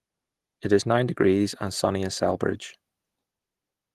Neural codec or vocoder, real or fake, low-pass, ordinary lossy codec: none; real; 14.4 kHz; Opus, 16 kbps